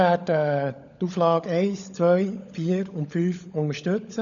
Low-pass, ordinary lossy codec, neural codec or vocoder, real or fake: 7.2 kHz; none; codec, 16 kHz, 16 kbps, FunCodec, trained on LibriTTS, 50 frames a second; fake